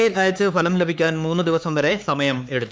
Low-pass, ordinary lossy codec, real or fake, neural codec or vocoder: none; none; fake; codec, 16 kHz, 4 kbps, X-Codec, HuBERT features, trained on LibriSpeech